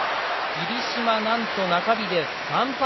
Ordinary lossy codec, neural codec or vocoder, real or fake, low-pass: MP3, 24 kbps; none; real; 7.2 kHz